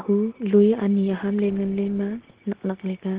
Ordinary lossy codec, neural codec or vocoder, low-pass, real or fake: Opus, 16 kbps; none; 3.6 kHz; real